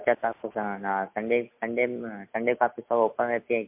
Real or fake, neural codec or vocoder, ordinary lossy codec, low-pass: real; none; MP3, 32 kbps; 3.6 kHz